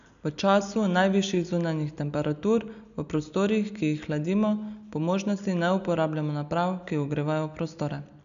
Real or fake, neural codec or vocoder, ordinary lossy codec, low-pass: real; none; none; 7.2 kHz